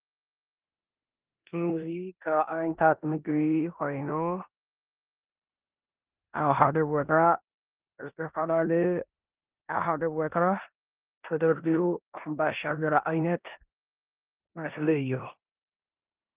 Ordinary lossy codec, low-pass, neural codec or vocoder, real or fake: Opus, 24 kbps; 3.6 kHz; codec, 16 kHz in and 24 kHz out, 0.9 kbps, LongCat-Audio-Codec, four codebook decoder; fake